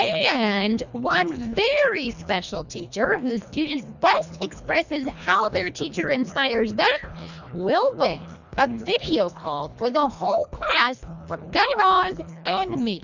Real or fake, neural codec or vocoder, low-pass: fake; codec, 24 kHz, 1.5 kbps, HILCodec; 7.2 kHz